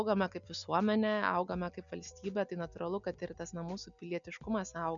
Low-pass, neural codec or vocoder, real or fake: 7.2 kHz; none; real